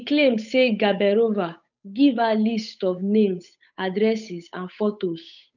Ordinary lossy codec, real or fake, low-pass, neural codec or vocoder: none; fake; 7.2 kHz; codec, 16 kHz, 8 kbps, FunCodec, trained on Chinese and English, 25 frames a second